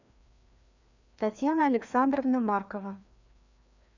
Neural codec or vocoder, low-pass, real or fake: codec, 16 kHz, 2 kbps, FreqCodec, larger model; 7.2 kHz; fake